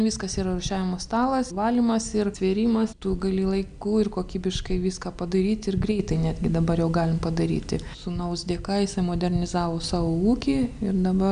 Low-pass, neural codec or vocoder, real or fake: 9.9 kHz; none; real